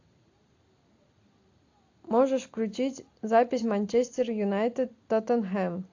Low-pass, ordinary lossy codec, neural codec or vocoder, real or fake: 7.2 kHz; AAC, 48 kbps; none; real